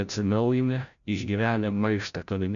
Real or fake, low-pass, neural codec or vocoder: fake; 7.2 kHz; codec, 16 kHz, 0.5 kbps, FreqCodec, larger model